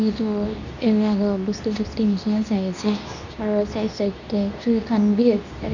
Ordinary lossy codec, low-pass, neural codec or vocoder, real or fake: none; 7.2 kHz; codec, 24 kHz, 0.9 kbps, WavTokenizer, medium speech release version 2; fake